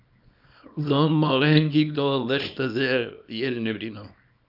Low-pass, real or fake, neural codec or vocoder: 5.4 kHz; fake; codec, 24 kHz, 0.9 kbps, WavTokenizer, small release